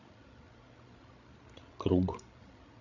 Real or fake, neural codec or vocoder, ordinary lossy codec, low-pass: fake; codec, 16 kHz, 16 kbps, FreqCodec, larger model; none; 7.2 kHz